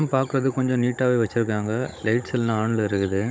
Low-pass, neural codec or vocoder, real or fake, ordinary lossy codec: none; codec, 16 kHz, 16 kbps, FunCodec, trained on Chinese and English, 50 frames a second; fake; none